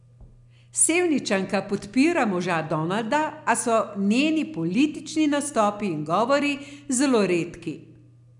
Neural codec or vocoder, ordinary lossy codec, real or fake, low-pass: none; MP3, 96 kbps; real; 10.8 kHz